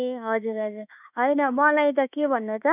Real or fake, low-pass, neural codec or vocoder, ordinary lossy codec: fake; 3.6 kHz; autoencoder, 48 kHz, 32 numbers a frame, DAC-VAE, trained on Japanese speech; none